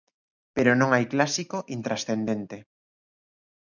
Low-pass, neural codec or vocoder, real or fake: 7.2 kHz; none; real